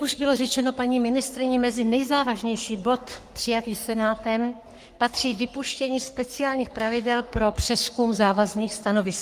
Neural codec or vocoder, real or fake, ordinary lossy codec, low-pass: codec, 44.1 kHz, 3.4 kbps, Pupu-Codec; fake; Opus, 32 kbps; 14.4 kHz